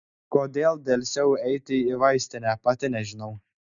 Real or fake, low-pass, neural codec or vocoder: real; 7.2 kHz; none